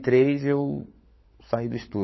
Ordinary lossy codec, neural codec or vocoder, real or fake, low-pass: MP3, 24 kbps; codec, 16 kHz, 16 kbps, FunCodec, trained on LibriTTS, 50 frames a second; fake; 7.2 kHz